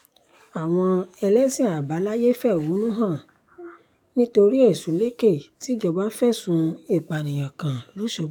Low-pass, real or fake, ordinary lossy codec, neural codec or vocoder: 19.8 kHz; fake; none; codec, 44.1 kHz, 7.8 kbps, DAC